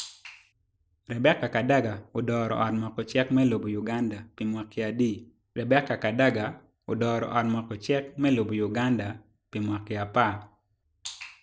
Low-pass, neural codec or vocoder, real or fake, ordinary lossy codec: none; none; real; none